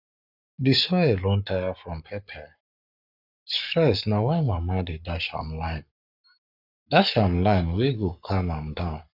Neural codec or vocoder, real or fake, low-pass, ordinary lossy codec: codec, 44.1 kHz, 7.8 kbps, Pupu-Codec; fake; 5.4 kHz; none